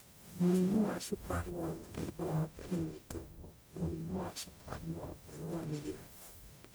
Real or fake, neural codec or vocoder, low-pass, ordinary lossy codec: fake; codec, 44.1 kHz, 0.9 kbps, DAC; none; none